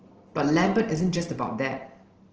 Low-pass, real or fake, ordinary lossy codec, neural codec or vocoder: 7.2 kHz; real; Opus, 16 kbps; none